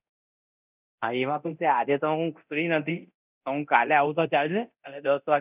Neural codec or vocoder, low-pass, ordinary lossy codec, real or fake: codec, 24 kHz, 0.9 kbps, DualCodec; 3.6 kHz; none; fake